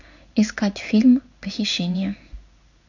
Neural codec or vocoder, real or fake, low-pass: codec, 16 kHz in and 24 kHz out, 1 kbps, XY-Tokenizer; fake; 7.2 kHz